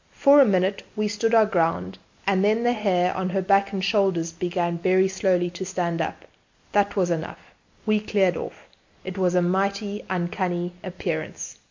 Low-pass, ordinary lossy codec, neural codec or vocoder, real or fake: 7.2 kHz; MP3, 48 kbps; none; real